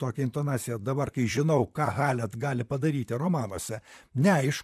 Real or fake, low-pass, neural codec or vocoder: fake; 14.4 kHz; vocoder, 44.1 kHz, 128 mel bands, Pupu-Vocoder